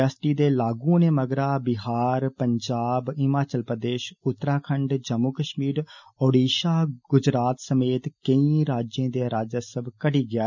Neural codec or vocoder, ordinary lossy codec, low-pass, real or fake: none; none; 7.2 kHz; real